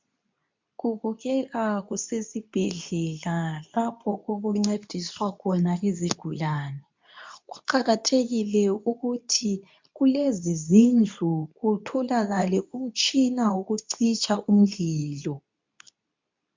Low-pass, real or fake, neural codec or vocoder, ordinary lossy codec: 7.2 kHz; fake; codec, 24 kHz, 0.9 kbps, WavTokenizer, medium speech release version 2; MP3, 64 kbps